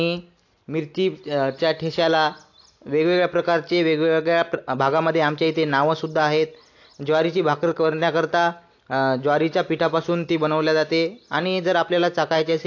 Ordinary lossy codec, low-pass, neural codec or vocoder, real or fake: AAC, 48 kbps; 7.2 kHz; none; real